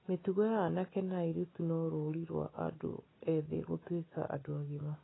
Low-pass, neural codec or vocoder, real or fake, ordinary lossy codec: 7.2 kHz; none; real; AAC, 16 kbps